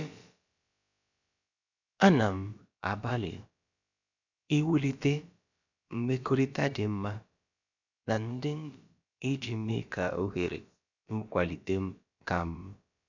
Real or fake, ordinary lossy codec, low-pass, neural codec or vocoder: fake; none; 7.2 kHz; codec, 16 kHz, about 1 kbps, DyCAST, with the encoder's durations